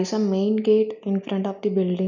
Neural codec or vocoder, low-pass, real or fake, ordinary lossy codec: none; 7.2 kHz; real; none